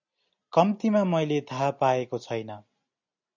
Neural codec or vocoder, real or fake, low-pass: none; real; 7.2 kHz